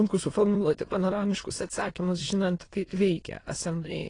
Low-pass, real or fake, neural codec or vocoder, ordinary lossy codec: 9.9 kHz; fake; autoencoder, 22.05 kHz, a latent of 192 numbers a frame, VITS, trained on many speakers; AAC, 32 kbps